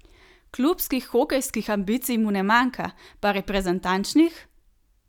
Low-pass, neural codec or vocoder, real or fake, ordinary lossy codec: 19.8 kHz; none; real; none